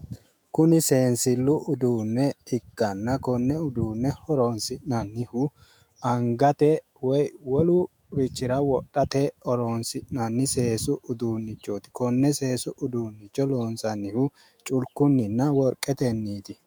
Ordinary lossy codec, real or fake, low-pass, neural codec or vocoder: MP3, 96 kbps; fake; 19.8 kHz; autoencoder, 48 kHz, 128 numbers a frame, DAC-VAE, trained on Japanese speech